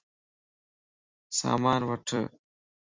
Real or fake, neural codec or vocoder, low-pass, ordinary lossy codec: fake; vocoder, 44.1 kHz, 128 mel bands every 256 samples, BigVGAN v2; 7.2 kHz; MP3, 64 kbps